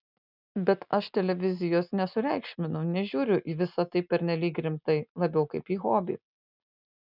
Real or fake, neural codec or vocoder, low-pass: real; none; 5.4 kHz